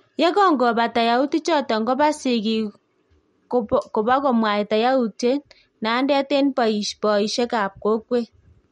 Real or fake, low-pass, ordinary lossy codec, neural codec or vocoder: real; 19.8 kHz; MP3, 48 kbps; none